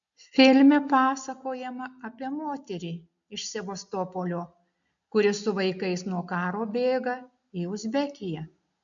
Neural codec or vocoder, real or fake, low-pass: none; real; 7.2 kHz